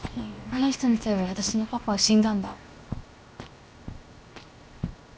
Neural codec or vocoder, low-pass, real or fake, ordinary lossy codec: codec, 16 kHz, 0.7 kbps, FocalCodec; none; fake; none